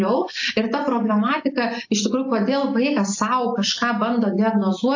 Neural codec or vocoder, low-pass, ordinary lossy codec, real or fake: none; 7.2 kHz; AAC, 48 kbps; real